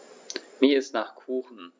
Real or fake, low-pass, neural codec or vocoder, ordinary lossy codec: real; none; none; none